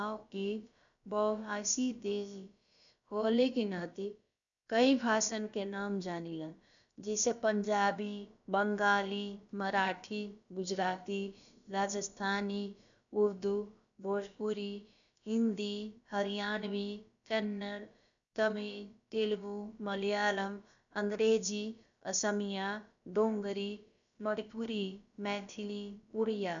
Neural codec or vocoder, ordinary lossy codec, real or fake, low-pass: codec, 16 kHz, about 1 kbps, DyCAST, with the encoder's durations; none; fake; 7.2 kHz